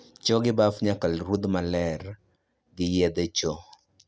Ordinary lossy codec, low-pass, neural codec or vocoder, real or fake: none; none; none; real